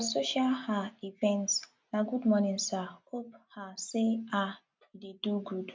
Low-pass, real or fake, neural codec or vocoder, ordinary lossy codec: none; real; none; none